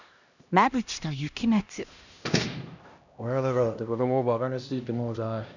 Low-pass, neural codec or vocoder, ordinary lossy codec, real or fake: 7.2 kHz; codec, 16 kHz, 1 kbps, X-Codec, HuBERT features, trained on LibriSpeech; none; fake